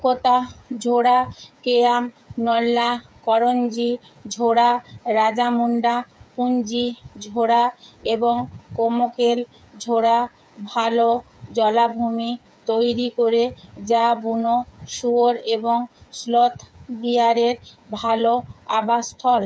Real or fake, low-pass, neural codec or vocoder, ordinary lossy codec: fake; none; codec, 16 kHz, 8 kbps, FreqCodec, smaller model; none